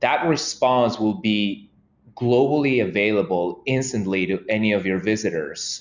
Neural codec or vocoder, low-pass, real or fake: none; 7.2 kHz; real